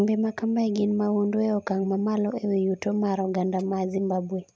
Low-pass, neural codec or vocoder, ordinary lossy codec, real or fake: none; none; none; real